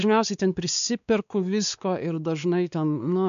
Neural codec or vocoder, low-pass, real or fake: codec, 16 kHz, 2 kbps, X-Codec, WavLM features, trained on Multilingual LibriSpeech; 7.2 kHz; fake